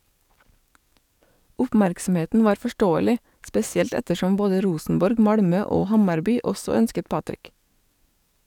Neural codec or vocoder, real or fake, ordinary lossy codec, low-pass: codec, 44.1 kHz, 7.8 kbps, DAC; fake; none; 19.8 kHz